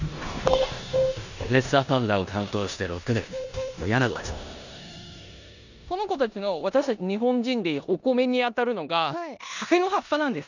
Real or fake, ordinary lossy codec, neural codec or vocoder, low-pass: fake; none; codec, 16 kHz in and 24 kHz out, 0.9 kbps, LongCat-Audio-Codec, four codebook decoder; 7.2 kHz